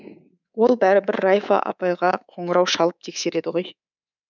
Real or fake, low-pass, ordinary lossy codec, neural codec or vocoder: fake; 7.2 kHz; none; codec, 24 kHz, 3.1 kbps, DualCodec